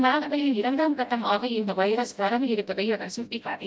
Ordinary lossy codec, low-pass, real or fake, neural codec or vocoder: none; none; fake; codec, 16 kHz, 0.5 kbps, FreqCodec, smaller model